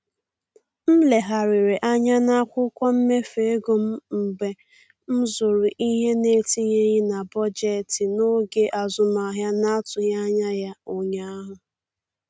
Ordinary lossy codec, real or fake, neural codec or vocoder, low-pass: none; real; none; none